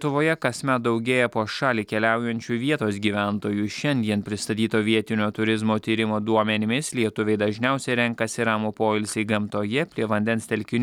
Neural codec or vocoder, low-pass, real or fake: none; 19.8 kHz; real